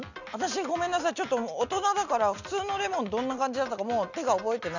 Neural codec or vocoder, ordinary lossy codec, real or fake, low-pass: none; none; real; 7.2 kHz